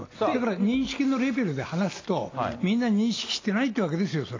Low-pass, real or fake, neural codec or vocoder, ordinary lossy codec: 7.2 kHz; real; none; AAC, 32 kbps